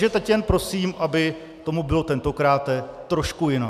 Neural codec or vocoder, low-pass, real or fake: none; 14.4 kHz; real